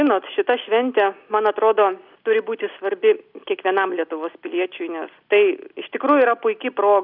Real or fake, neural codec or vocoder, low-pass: real; none; 5.4 kHz